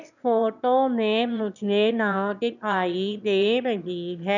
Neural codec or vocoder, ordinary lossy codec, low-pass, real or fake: autoencoder, 22.05 kHz, a latent of 192 numbers a frame, VITS, trained on one speaker; none; 7.2 kHz; fake